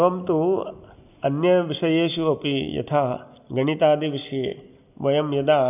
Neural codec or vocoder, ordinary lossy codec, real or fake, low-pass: none; none; real; 3.6 kHz